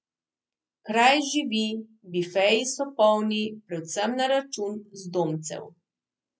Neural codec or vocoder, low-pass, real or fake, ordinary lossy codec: none; none; real; none